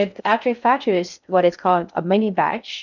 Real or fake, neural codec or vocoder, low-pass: fake; codec, 16 kHz in and 24 kHz out, 0.6 kbps, FocalCodec, streaming, 2048 codes; 7.2 kHz